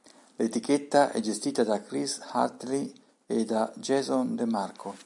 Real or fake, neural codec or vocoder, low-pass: real; none; 10.8 kHz